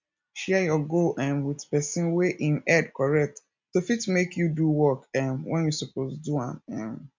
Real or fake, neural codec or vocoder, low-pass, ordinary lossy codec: real; none; 7.2 kHz; MP3, 64 kbps